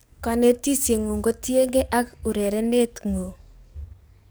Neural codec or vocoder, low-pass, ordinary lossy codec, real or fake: codec, 44.1 kHz, 7.8 kbps, DAC; none; none; fake